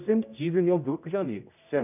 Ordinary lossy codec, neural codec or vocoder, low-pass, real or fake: none; codec, 16 kHz, 0.5 kbps, X-Codec, HuBERT features, trained on general audio; 3.6 kHz; fake